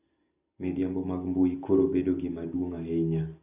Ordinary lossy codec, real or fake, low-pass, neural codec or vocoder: none; real; 3.6 kHz; none